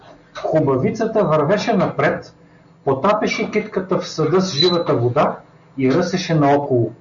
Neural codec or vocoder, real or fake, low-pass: none; real; 7.2 kHz